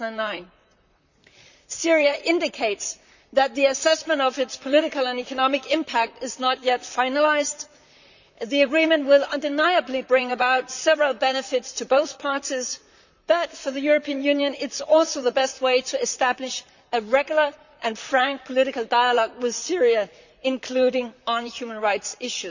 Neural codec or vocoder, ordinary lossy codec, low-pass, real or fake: vocoder, 44.1 kHz, 128 mel bands, Pupu-Vocoder; none; 7.2 kHz; fake